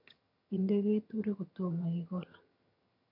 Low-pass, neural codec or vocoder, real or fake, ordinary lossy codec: 5.4 kHz; vocoder, 22.05 kHz, 80 mel bands, HiFi-GAN; fake; none